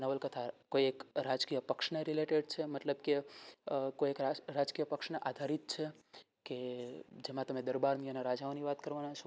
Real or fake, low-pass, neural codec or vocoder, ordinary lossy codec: real; none; none; none